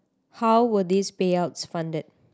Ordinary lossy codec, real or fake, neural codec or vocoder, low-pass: none; real; none; none